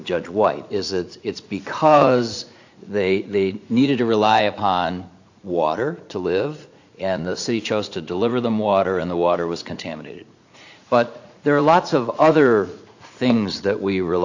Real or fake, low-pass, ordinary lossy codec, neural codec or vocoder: fake; 7.2 kHz; AAC, 48 kbps; vocoder, 44.1 kHz, 80 mel bands, Vocos